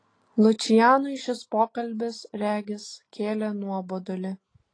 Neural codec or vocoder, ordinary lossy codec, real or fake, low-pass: none; AAC, 32 kbps; real; 9.9 kHz